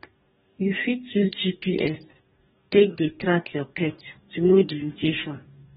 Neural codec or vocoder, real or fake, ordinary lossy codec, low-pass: codec, 44.1 kHz, 2.6 kbps, DAC; fake; AAC, 16 kbps; 19.8 kHz